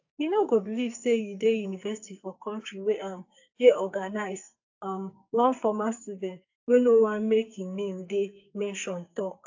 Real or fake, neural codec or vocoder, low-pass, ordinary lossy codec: fake; codec, 44.1 kHz, 2.6 kbps, SNAC; 7.2 kHz; AAC, 48 kbps